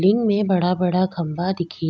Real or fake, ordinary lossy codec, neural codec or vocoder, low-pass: real; none; none; none